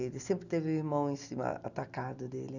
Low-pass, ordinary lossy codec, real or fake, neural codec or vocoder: 7.2 kHz; none; real; none